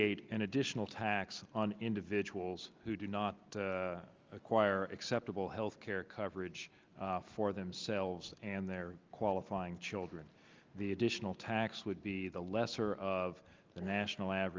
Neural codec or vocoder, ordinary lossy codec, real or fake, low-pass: none; Opus, 32 kbps; real; 7.2 kHz